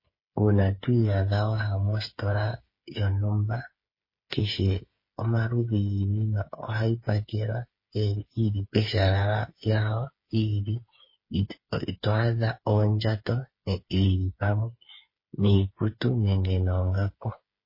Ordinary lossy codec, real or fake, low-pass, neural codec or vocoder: MP3, 24 kbps; fake; 5.4 kHz; codec, 16 kHz, 8 kbps, FreqCodec, smaller model